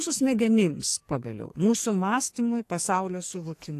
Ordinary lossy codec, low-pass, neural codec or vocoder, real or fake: AAC, 64 kbps; 14.4 kHz; codec, 44.1 kHz, 2.6 kbps, SNAC; fake